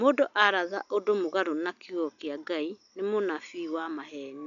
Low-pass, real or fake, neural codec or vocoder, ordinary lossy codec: 7.2 kHz; real; none; MP3, 96 kbps